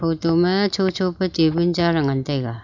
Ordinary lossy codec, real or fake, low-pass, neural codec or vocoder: none; real; 7.2 kHz; none